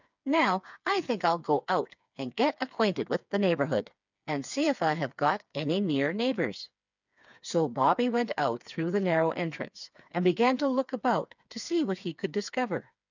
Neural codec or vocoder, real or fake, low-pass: codec, 16 kHz, 4 kbps, FreqCodec, smaller model; fake; 7.2 kHz